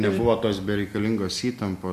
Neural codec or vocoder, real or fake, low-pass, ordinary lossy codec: none; real; 14.4 kHz; MP3, 64 kbps